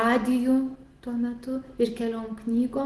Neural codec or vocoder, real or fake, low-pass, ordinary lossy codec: none; real; 10.8 kHz; Opus, 16 kbps